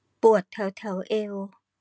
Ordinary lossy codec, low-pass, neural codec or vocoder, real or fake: none; none; none; real